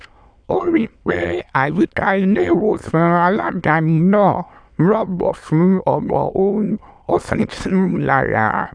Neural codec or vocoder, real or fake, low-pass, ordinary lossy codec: autoencoder, 22.05 kHz, a latent of 192 numbers a frame, VITS, trained on many speakers; fake; 9.9 kHz; none